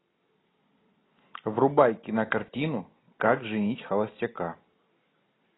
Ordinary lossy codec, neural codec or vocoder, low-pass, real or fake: AAC, 16 kbps; none; 7.2 kHz; real